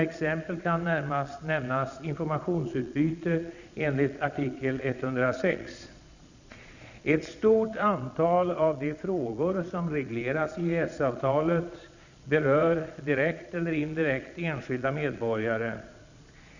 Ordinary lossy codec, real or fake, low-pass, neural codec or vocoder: none; fake; 7.2 kHz; vocoder, 22.05 kHz, 80 mel bands, Vocos